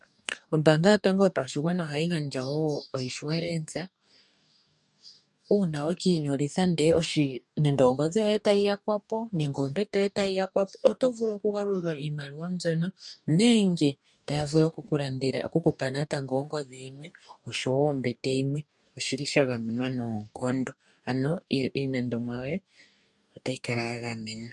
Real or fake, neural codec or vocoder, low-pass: fake; codec, 44.1 kHz, 2.6 kbps, DAC; 10.8 kHz